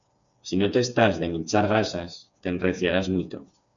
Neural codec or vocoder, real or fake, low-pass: codec, 16 kHz, 4 kbps, FreqCodec, smaller model; fake; 7.2 kHz